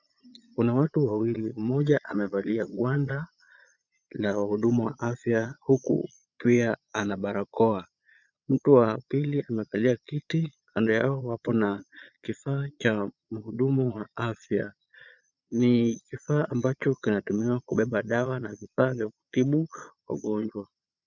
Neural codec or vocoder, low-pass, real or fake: vocoder, 22.05 kHz, 80 mel bands, Vocos; 7.2 kHz; fake